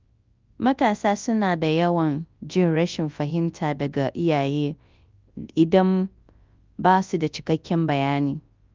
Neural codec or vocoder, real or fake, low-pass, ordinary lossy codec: codec, 24 kHz, 0.9 kbps, WavTokenizer, large speech release; fake; 7.2 kHz; Opus, 24 kbps